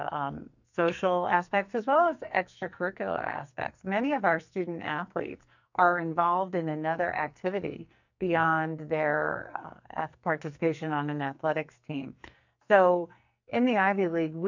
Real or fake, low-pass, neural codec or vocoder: fake; 7.2 kHz; codec, 44.1 kHz, 2.6 kbps, SNAC